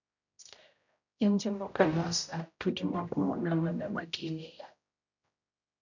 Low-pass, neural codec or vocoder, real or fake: 7.2 kHz; codec, 16 kHz, 0.5 kbps, X-Codec, HuBERT features, trained on general audio; fake